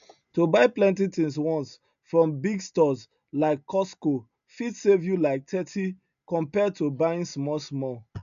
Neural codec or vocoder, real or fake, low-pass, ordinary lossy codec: none; real; 7.2 kHz; MP3, 96 kbps